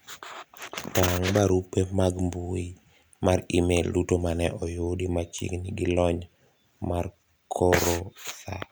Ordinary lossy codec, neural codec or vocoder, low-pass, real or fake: none; none; none; real